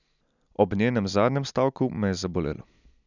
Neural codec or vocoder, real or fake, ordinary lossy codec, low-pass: none; real; none; 7.2 kHz